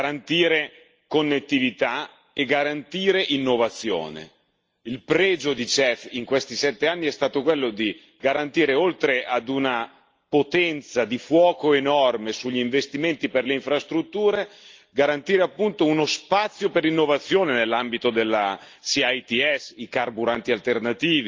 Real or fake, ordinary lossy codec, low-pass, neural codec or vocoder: real; Opus, 24 kbps; 7.2 kHz; none